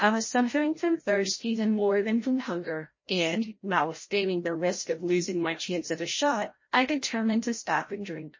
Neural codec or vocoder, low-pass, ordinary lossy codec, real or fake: codec, 16 kHz, 0.5 kbps, FreqCodec, larger model; 7.2 kHz; MP3, 32 kbps; fake